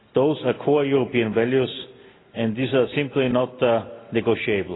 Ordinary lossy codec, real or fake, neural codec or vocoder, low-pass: AAC, 16 kbps; real; none; 7.2 kHz